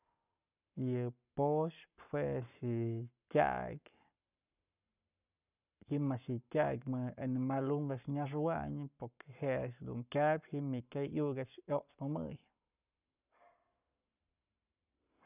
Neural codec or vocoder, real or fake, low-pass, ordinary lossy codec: codec, 44.1 kHz, 7.8 kbps, Pupu-Codec; fake; 3.6 kHz; none